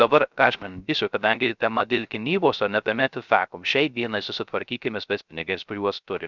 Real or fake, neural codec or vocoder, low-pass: fake; codec, 16 kHz, 0.3 kbps, FocalCodec; 7.2 kHz